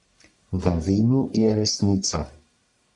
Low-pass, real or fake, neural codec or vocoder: 10.8 kHz; fake; codec, 44.1 kHz, 1.7 kbps, Pupu-Codec